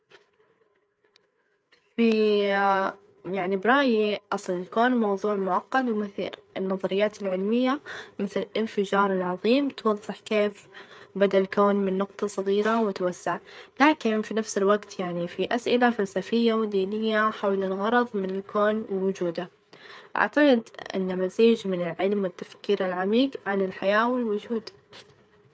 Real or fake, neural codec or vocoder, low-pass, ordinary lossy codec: fake; codec, 16 kHz, 4 kbps, FreqCodec, larger model; none; none